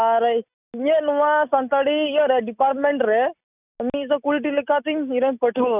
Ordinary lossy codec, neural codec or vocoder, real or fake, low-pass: none; none; real; 3.6 kHz